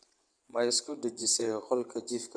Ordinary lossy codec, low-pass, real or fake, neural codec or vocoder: none; 9.9 kHz; fake; vocoder, 22.05 kHz, 80 mel bands, WaveNeXt